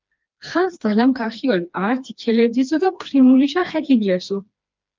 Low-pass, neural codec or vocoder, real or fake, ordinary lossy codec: 7.2 kHz; codec, 16 kHz, 2 kbps, FreqCodec, smaller model; fake; Opus, 24 kbps